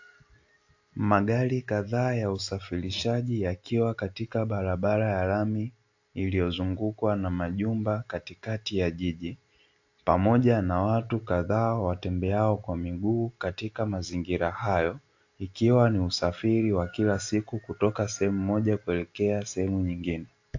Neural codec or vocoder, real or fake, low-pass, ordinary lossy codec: none; real; 7.2 kHz; AAC, 48 kbps